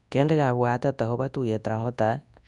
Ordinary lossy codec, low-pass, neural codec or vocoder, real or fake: none; 10.8 kHz; codec, 24 kHz, 0.9 kbps, WavTokenizer, large speech release; fake